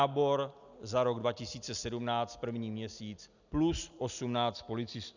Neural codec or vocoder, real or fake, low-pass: none; real; 7.2 kHz